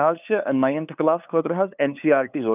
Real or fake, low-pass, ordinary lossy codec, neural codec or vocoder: fake; 3.6 kHz; none; codec, 16 kHz, 2 kbps, FunCodec, trained on LibriTTS, 25 frames a second